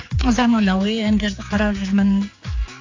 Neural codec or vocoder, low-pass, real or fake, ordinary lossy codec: codec, 16 kHz, 4 kbps, X-Codec, HuBERT features, trained on general audio; 7.2 kHz; fake; AAC, 48 kbps